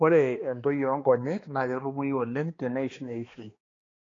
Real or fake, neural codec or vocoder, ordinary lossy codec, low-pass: fake; codec, 16 kHz, 2 kbps, X-Codec, HuBERT features, trained on balanced general audio; AAC, 32 kbps; 7.2 kHz